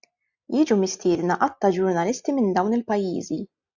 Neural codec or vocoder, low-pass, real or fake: none; 7.2 kHz; real